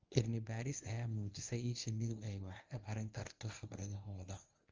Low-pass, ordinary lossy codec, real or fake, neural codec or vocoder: 7.2 kHz; Opus, 32 kbps; fake; codec, 24 kHz, 0.9 kbps, WavTokenizer, medium speech release version 1